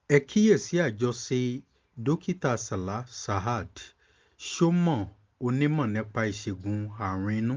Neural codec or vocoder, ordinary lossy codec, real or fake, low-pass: none; Opus, 32 kbps; real; 7.2 kHz